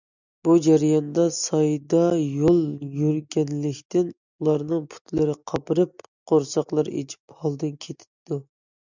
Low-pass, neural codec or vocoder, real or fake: 7.2 kHz; none; real